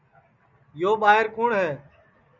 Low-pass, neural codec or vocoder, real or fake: 7.2 kHz; none; real